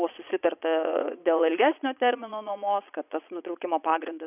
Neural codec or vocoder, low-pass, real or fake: vocoder, 22.05 kHz, 80 mel bands, Vocos; 3.6 kHz; fake